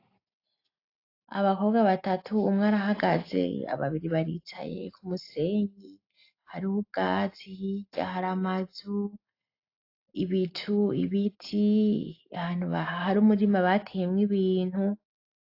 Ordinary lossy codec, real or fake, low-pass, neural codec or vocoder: AAC, 32 kbps; real; 5.4 kHz; none